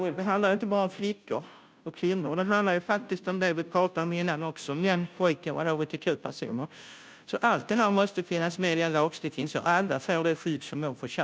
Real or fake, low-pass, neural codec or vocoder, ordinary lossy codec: fake; none; codec, 16 kHz, 0.5 kbps, FunCodec, trained on Chinese and English, 25 frames a second; none